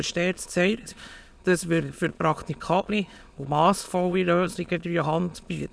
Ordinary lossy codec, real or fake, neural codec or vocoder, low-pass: none; fake; autoencoder, 22.05 kHz, a latent of 192 numbers a frame, VITS, trained on many speakers; none